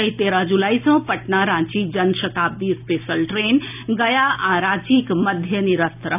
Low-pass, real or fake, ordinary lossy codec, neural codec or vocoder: 3.6 kHz; real; none; none